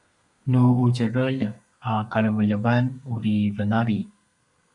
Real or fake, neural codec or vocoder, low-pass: fake; codec, 32 kHz, 1.9 kbps, SNAC; 10.8 kHz